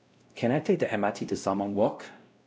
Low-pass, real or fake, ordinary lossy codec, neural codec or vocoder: none; fake; none; codec, 16 kHz, 0.5 kbps, X-Codec, WavLM features, trained on Multilingual LibriSpeech